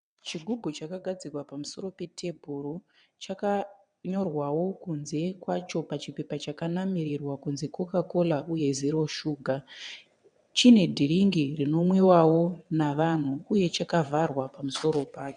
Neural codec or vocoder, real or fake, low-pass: vocoder, 22.05 kHz, 80 mel bands, WaveNeXt; fake; 9.9 kHz